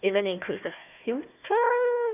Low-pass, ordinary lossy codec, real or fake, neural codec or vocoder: 3.6 kHz; none; fake; codec, 16 kHz, 1 kbps, FunCodec, trained on Chinese and English, 50 frames a second